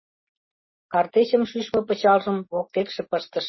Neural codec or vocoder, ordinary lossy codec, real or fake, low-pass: none; MP3, 24 kbps; real; 7.2 kHz